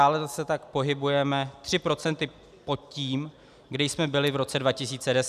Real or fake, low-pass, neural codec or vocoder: real; 14.4 kHz; none